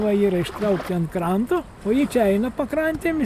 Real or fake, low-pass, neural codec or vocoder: real; 14.4 kHz; none